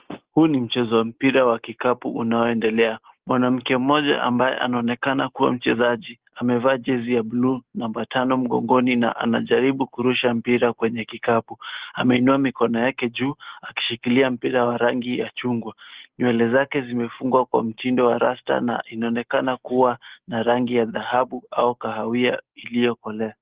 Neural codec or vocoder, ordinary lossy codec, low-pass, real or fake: none; Opus, 16 kbps; 3.6 kHz; real